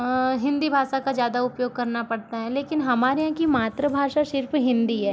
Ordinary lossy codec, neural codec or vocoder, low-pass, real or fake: none; none; none; real